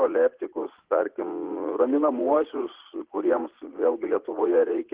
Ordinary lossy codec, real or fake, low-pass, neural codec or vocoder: Opus, 16 kbps; fake; 3.6 kHz; vocoder, 44.1 kHz, 80 mel bands, Vocos